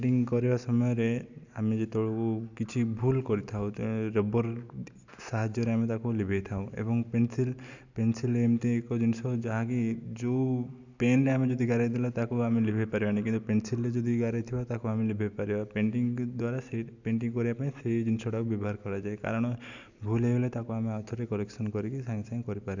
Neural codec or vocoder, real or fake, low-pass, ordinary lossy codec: none; real; 7.2 kHz; none